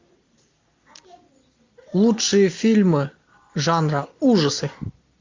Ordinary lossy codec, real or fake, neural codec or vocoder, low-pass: MP3, 48 kbps; real; none; 7.2 kHz